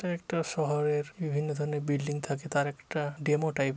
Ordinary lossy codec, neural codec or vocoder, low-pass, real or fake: none; none; none; real